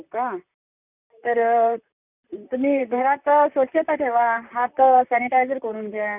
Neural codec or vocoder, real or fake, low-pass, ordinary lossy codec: vocoder, 44.1 kHz, 128 mel bands, Pupu-Vocoder; fake; 3.6 kHz; none